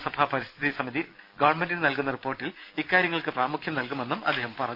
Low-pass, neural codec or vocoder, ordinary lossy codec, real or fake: 5.4 kHz; vocoder, 44.1 kHz, 128 mel bands every 256 samples, BigVGAN v2; none; fake